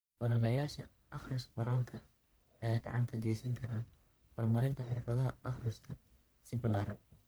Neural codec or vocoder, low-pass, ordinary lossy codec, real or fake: codec, 44.1 kHz, 1.7 kbps, Pupu-Codec; none; none; fake